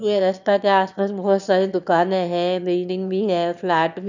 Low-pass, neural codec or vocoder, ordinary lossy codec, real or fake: 7.2 kHz; autoencoder, 22.05 kHz, a latent of 192 numbers a frame, VITS, trained on one speaker; none; fake